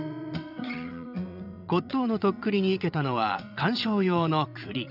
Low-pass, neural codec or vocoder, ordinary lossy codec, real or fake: 5.4 kHz; vocoder, 22.05 kHz, 80 mel bands, WaveNeXt; none; fake